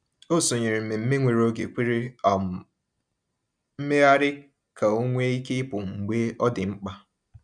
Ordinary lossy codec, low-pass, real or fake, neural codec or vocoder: none; 9.9 kHz; real; none